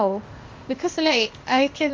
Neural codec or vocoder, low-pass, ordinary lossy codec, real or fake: codec, 16 kHz, 0.8 kbps, ZipCodec; 7.2 kHz; Opus, 32 kbps; fake